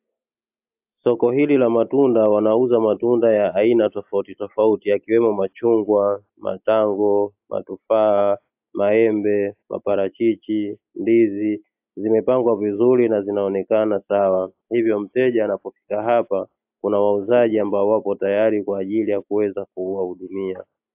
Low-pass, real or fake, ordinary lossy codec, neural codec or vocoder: 3.6 kHz; real; AAC, 32 kbps; none